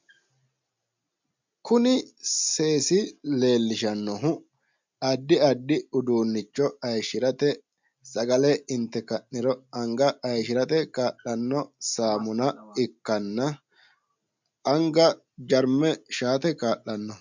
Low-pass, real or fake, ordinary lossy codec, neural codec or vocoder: 7.2 kHz; real; MP3, 64 kbps; none